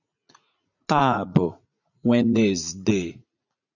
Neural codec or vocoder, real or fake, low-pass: vocoder, 44.1 kHz, 80 mel bands, Vocos; fake; 7.2 kHz